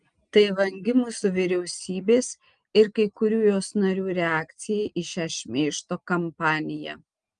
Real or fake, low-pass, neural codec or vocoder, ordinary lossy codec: real; 9.9 kHz; none; Opus, 32 kbps